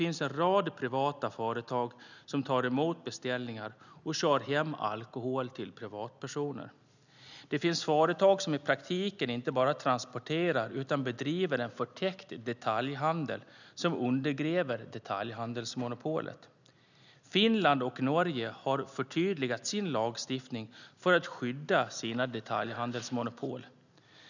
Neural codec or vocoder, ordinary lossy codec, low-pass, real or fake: none; none; 7.2 kHz; real